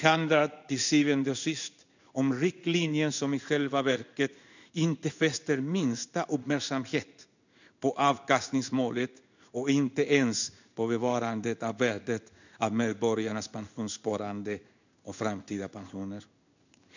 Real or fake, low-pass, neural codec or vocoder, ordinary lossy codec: fake; 7.2 kHz; codec, 16 kHz in and 24 kHz out, 1 kbps, XY-Tokenizer; none